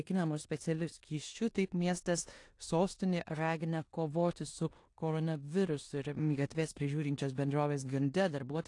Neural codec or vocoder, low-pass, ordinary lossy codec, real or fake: codec, 16 kHz in and 24 kHz out, 0.9 kbps, LongCat-Audio-Codec, four codebook decoder; 10.8 kHz; AAC, 48 kbps; fake